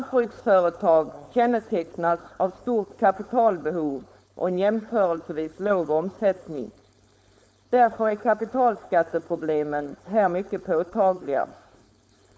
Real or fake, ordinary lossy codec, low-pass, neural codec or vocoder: fake; none; none; codec, 16 kHz, 4.8 kbps, FACodec